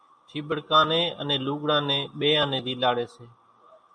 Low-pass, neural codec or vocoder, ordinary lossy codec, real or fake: 9.9 kHz; none; MP3, 96 kbps; real